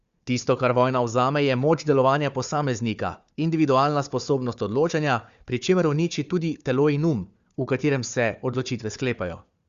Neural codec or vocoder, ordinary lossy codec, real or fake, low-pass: codec, 16 kHz, 4 kbps, FunCodec, trained on Chinese and English, 50 frames a second; Opus, 64 kbps; fake; 7.2 kHz